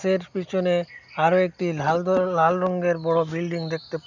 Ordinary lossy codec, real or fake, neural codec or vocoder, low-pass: none; real; none; 7.2 kHz